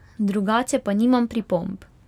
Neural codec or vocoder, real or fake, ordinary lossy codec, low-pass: none; real; none; 19.8 kHz